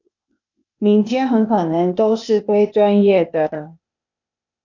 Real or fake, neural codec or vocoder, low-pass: fake; codec, 16 kHz, 0.8 kbps, ZipCodec; 7.2 kHz